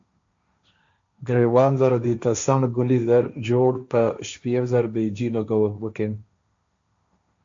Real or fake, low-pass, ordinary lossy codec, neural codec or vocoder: fake; 7.2 kHz; AAC, 64 kbps; codec, 16 kHz, 1.1 kbps, Voila-Tokenizer